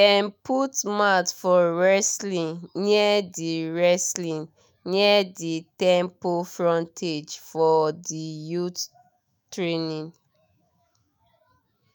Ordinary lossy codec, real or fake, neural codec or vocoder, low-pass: none; fake; autoencoder, 48 kHz, 128 numbers a frame, DAC-VAE, trained on Japanese speech; none